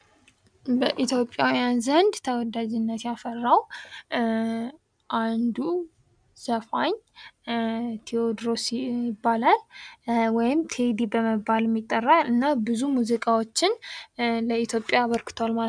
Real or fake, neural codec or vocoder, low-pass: real; none; 9.9 kHz